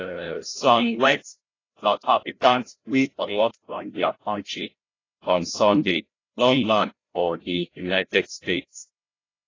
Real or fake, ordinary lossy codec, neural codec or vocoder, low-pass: fake; AAC, 32 kbps; codec, 16 kHz, 0.5 kbps, FreqCodec, larger model; 7.2 kHz